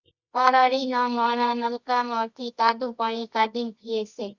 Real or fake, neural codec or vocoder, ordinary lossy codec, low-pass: fake; codec, 24 kHz, 0.9 kbps, WavTokenizer, medium music audio release; Opus, 64 kbps; 7.2 kHz